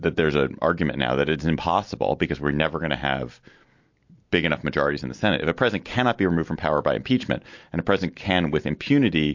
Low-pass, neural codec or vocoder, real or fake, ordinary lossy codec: 7.2 kHz; none; real; MP3, 48 kbps